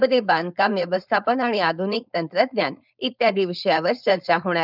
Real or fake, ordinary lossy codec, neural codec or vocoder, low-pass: fake; none; codec, 16 kHz, 4.8 kbps, FACodec; 5.4 kHz